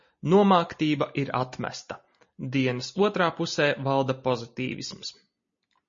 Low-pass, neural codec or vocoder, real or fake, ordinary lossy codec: 7.2 kHz; none; real; MP3, 32 kbps